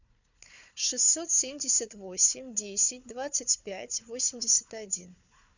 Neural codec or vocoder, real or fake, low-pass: codec, 16 kHz, 4 kbps, FunCodec, trained on Chinese and English, 50 frames a second; fake; 7.2 kHz